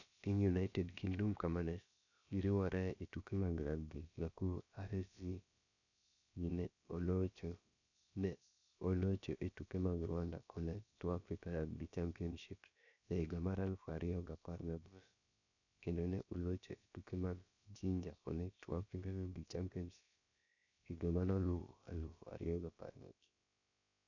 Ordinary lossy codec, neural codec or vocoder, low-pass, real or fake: none; codec, 16 kHz, about 1 kbps, DyCAST, with the encoder's durations; 7.2 kHz; fake